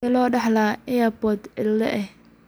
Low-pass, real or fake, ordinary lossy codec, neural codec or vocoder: none; real; none; none